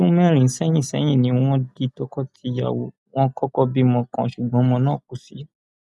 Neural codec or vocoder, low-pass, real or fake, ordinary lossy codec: none; none; real; none